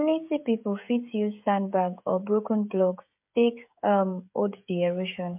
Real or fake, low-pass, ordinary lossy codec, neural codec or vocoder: fake; 3.6 kHz; MP3, 32 kbps; codec, 16 kHz, 16 kbps, FreqCodec, smaller model